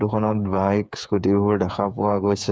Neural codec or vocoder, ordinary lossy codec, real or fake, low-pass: codec, 16 kHz, 8 kbps, FreqCodec, smaller model; none; fake; none